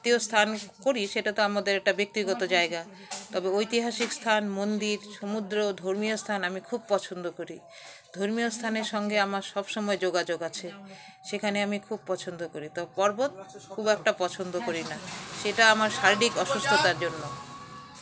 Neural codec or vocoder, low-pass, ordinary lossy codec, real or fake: none; none; none; real